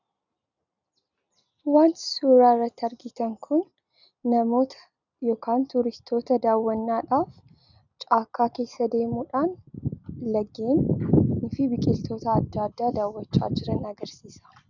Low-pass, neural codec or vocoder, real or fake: 7.2 kHz; none; real